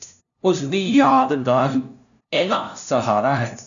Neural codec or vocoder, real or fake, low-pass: codec, 16 kHz, 0.5 kbps, FunCodec, trained on LibriTTS, 25 frames a second; fake; 7.2 kHz